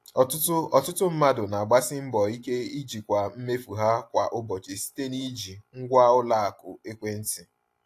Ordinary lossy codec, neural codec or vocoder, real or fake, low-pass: AAC, 64 kbps; none; real; 14.4 kHz